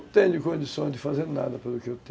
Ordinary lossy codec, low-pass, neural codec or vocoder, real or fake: none; none; none; real